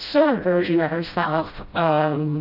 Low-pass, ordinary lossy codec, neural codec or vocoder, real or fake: 5.4 kHz; AAC, 48 kbps; codec, 16 kHz, 0.5 kbps, FreqCodec, smaller model; fake